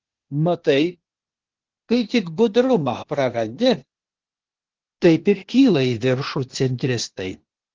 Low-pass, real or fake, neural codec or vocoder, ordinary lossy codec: 7.2 kHz; fake; codec, 16 kHz, 0.8 kbps, ZipCodec; Opus, 16 kbps